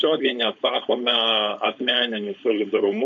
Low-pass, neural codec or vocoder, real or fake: 7.2 kHz; codec, 16 kHz, 4.8 kbps, FACodec; fake